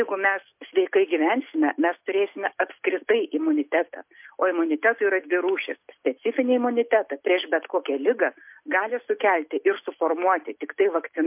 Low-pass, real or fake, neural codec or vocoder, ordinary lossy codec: 3.6 kHz; real; none; MP3, 32 kbps